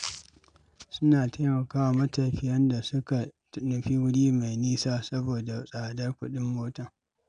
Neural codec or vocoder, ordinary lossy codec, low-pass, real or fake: none; none; 9.9 kHz; real